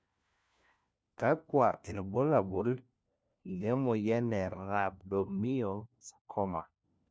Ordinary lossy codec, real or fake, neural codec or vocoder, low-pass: none; fake; codec, 16 kHz, 1 kbps, FunCodec, trained on LibriTTS, 50 frames a second; none